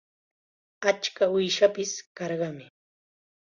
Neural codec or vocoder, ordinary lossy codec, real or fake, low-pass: none; Opus, 64 kbps; real; 7.2 kHz